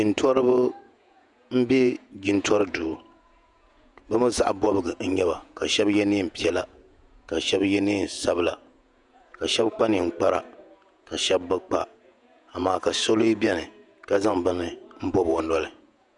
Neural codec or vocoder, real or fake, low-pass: vocoder, 48 kHz, 128 mel bands, Vocos; fake; 10.8 kHz